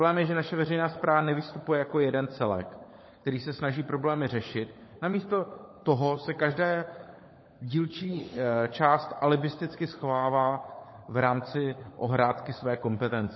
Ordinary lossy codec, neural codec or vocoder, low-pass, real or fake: MP3, 24 kbps; codec, 16 kHz, 16 kbps, FunCodec, trained on LibriTTS, 50 frames a second; 7.2 kHz; fake